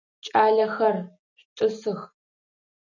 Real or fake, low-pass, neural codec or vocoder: real; 7.2 kHz; none